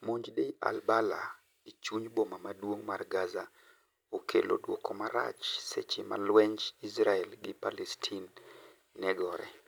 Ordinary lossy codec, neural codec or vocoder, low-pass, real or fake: none; none; none; real